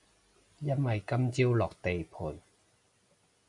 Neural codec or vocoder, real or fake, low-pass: none; real; 10.8 kHz